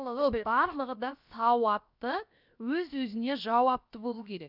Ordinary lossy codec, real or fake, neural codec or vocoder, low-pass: none; fake; codec, 16 kHz, about 1 kbps, DyCAST, with the encoder's durations; 5.4 kHz